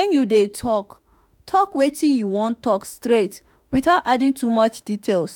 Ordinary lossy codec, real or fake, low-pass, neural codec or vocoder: none; fake; 19.8 kHz; autoencoder, 48 kHz, 32 numbers a frame, DAC-VAE, trained on Japanese speech